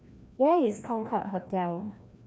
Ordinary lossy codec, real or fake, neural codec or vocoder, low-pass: none; fake; codec, 16 kHz, 1 kbps, FreqCodec, larger model; none